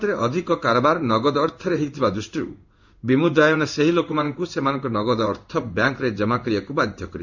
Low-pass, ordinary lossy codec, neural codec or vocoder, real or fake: 7.2 kHz; none; codec, 16 kHz in and 24 kHz out, 1 kbps, XY-Tokenizer; fake